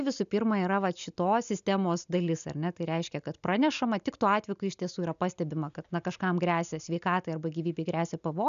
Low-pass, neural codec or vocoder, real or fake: 7.2 kHz; none; real